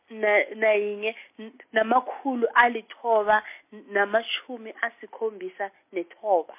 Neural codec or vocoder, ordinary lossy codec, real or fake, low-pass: none; MP3, 24 kbps; real; 3.6 kHz